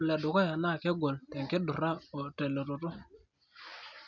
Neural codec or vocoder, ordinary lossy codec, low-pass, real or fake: none; none; 7.2 kHz; real